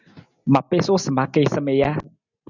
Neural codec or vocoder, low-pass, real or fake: none; 7.2 kHz; real